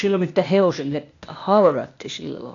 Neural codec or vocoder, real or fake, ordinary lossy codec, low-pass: codec, 16 kHz, 0.8 kbps, ZipCodec; fake; AAC, 48 kbps; 7.2 kHz